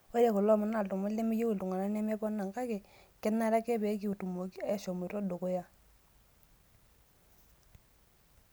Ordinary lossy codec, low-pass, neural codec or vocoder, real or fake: none; none; none; real